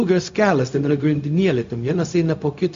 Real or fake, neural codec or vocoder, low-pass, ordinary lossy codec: fake; codec, 16 kHz, 0.4 kbps, LongCat-Audio-Codec; 7.2 kHz; MP3, 48 kbps